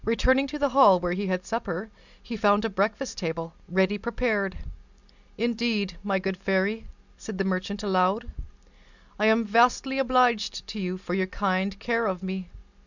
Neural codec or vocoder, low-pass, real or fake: none; 7.2 kHz; real